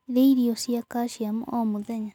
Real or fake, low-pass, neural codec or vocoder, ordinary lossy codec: real; 19.8 kHz; none; none